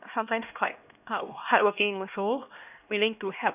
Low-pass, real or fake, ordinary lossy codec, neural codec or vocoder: 3.6 kHz; fake; none; codec, 16 kHz, 1 kbps, X-Codec, HuBERT features, trained on LibriSpeech